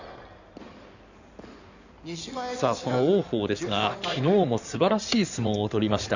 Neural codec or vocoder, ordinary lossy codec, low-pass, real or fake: codec, 16 kHz in and 24 kHz out, 2.2 kbps, FireRedTTS-2 codec; none; 7.2 kHz; fake